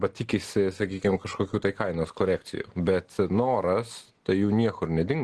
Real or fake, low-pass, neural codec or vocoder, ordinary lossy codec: real; 10.8 kHz; none; Opus, 16 kbps